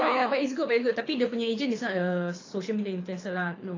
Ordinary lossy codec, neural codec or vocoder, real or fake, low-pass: AAC, 32 kbps; codec, 24 kHz, 6 kbps, HILCodec; fake; 7.2 kHz